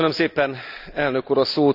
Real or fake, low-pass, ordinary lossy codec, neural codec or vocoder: real; 5.4 kHz; none; none